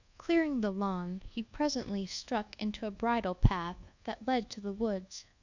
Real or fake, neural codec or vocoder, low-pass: fake; codec, 24 kHz, 1.2 kbps, DualCodec; 7.2 kHz